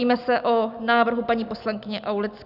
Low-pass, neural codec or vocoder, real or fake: 5.4 kHz; none; real